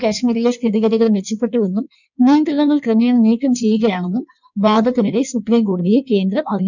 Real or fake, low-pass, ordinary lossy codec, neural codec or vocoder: fake; 7.2 kHz; none; codec, 16 kHz in and 24 kHz out, 1.1 kbps, FireRedTTS-2 codec